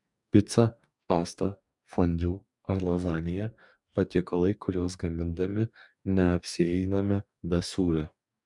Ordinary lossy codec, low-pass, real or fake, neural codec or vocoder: MP3, 96 kbps; 10.8 kHz; fake; codec, 44.1 kHz, 2.6 kbps, DAC